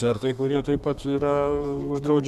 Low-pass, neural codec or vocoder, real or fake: 14.4 kHz; codec, 32 kHz, 1.9 kbps, SNAC; fake